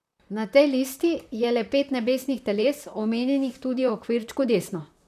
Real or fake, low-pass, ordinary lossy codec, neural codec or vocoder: fake; 14.4 kHz; none; vocoder, 44.1 kHz, 128 mel bands, Pupu-Vocoder